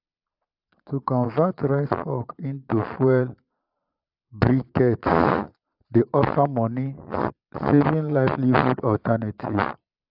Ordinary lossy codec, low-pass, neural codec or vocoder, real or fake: AAC, 48 kbps; 5.4 kHz; vocoder, 22.05 kHz, 80 mel bands, Vocos; fake